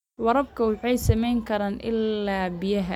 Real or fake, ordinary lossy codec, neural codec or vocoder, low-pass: real; none; none; 19.8 kHz